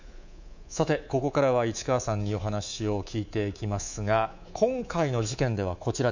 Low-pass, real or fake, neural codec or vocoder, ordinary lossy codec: 7.2 kHz; fake; codec, 24 kHz, 3.1 kbps, DualCodec; none